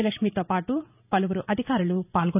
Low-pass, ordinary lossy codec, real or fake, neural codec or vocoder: 3.6 kHz; none; fake; vocoder, 44.1 kHz, 128 mel bands every 512 samples, BigVGAN v2